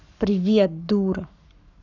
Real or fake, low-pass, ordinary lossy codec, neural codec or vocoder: fake; 7.2 kHz; none; codec, 44.1 kHz, 7.8 kbps, Pupu-Codec